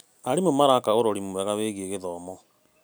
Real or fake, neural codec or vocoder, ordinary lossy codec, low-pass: real; none; none; none